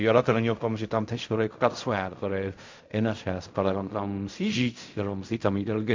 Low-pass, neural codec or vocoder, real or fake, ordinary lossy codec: 7.2 kHz; codec, 16 kHz in and 24 kHz out, 0.4 kbps, LongCat-Audio-Codec, fine tuned four codebook decoder; fake; MP3, 64 kbps